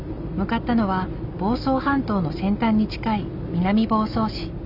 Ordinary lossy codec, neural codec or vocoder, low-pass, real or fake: none; none; 5.4 kHz; real